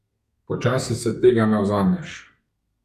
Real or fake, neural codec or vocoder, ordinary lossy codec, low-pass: fake; codec, 32 kHz, 1.9 kbps, SNAC; none; 14.4 kHz